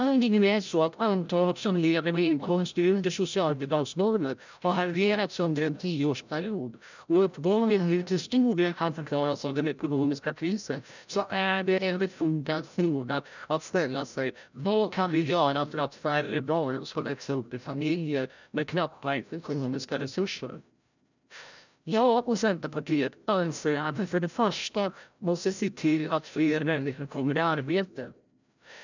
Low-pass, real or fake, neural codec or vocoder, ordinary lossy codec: 7.2 kHz; fake; codec, 16 kHz, 0.5 kbps, FreqCodec, larger model; none